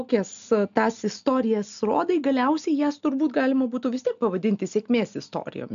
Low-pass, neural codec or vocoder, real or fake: 7.2 kHz; none; real